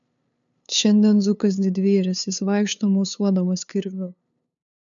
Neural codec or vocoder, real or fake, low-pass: codec, 16 kHz, 2 kbps, FunCodec, trained on LibriTTS, 25 frames a second; fake; 7.2 kHz